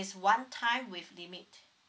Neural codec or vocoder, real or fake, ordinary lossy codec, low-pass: none; real; none; none